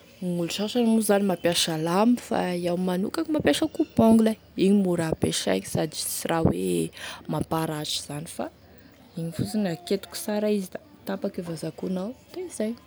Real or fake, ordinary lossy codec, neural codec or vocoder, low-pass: real; none; none; none